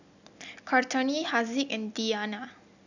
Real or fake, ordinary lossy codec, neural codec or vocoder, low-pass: real; none; none; 7.2 kHz